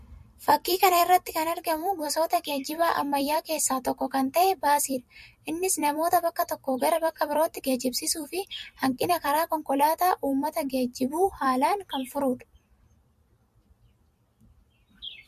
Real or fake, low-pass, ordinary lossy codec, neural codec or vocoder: fake; 14.4 kHz; MP3, 64 kbps; vocoder, 44.1 kHz, 128 mel bands every 256 samples, BigVGAN v2